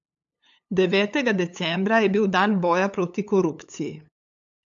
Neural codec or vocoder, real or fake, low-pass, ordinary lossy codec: codec, 16 kHz, 8 kbps, FunCodec, trained on LibriTTS, 25 frames a second; fake; 7.2 kHz; AAC, 64 kbps